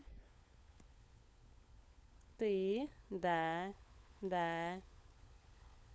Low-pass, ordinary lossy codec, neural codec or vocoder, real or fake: none; none; codec, 16 kHz, 16 kbps, FunCodec, trained on LibriTTS, 50 frames a second; fake